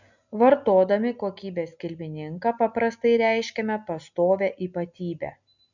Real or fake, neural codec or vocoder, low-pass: real; none; 7.2 kHz